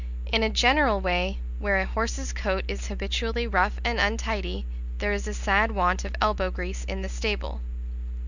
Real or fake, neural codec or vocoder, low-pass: real; none; 7.2 kHz